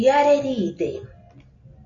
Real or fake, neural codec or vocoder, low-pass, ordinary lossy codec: real; none; 7.2 kHz; AAC, 48 kbps